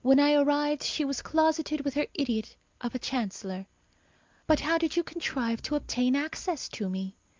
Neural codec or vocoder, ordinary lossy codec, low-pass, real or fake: none; Opus, 24 kbps; 7.2 kHz; real